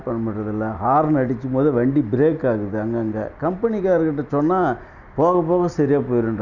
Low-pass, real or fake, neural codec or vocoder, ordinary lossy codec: 7.2 kHz; real; none; none